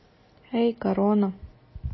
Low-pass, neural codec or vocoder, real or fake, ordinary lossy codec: 7.2 kHz; none; real; MP3, 24 kbps